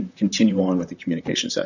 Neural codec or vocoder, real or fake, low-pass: vocoder, 44.1 kHz, 128 mel bands, Pupu-Vocoder; fake; 7.2 kHz